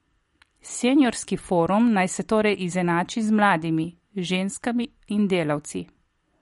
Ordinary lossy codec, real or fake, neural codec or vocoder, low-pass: MP3, 48 kbps; real; none; 14.4 kHz